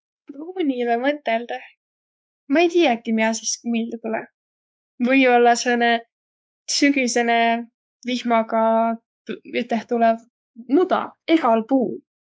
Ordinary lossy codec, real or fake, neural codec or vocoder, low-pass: none; fake; codec, 16 kHz, 4 kbps, X-Codec, WavLM features, trained on Multilingual LibriSpeech; none